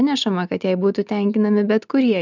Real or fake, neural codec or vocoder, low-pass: real; none; 7.2 kHz